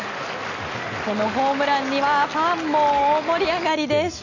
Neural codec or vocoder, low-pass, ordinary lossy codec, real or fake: none; 7.2 kHz; none; real